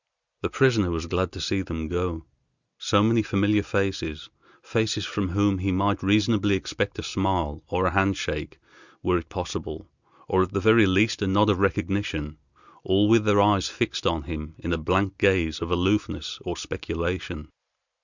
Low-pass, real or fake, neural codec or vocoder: 7.2 kHz; real; none